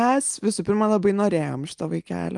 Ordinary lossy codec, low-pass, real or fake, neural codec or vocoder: Opus, 32 kbps; 10.8 kHz; real; none